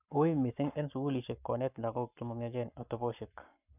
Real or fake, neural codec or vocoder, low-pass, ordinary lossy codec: fake; codec, 44.1 kHz, 7.8 kbps, Pupu-Codec; 3.6 kHz; none